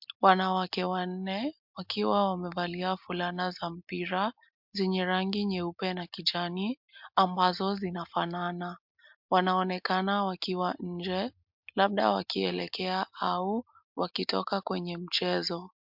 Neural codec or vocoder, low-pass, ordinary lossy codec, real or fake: none; 5.4 kHz; MP3, 48 kbps; real